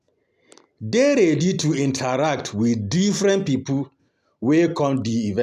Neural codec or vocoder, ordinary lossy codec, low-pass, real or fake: none; none; 14.4 kHz; real